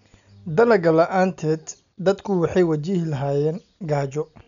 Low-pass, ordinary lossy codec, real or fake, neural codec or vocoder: 7.2 kHz; none; real; none